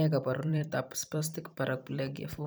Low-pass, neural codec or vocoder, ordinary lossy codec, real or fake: none; vocoder, 44.1 kHz, 128 mel bands every 512 samples, BigVGAN v2; none; fake